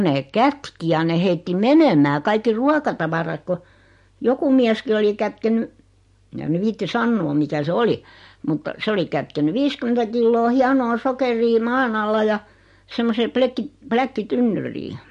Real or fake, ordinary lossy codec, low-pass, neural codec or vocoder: real; MP3, 48 kbps; 14.4 kHz; none